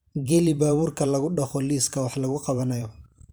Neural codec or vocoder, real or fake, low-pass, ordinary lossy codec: vocoder, 44.1 kHz, 128 mel bands every 512 samples, BigVGAN v2; fake; none; none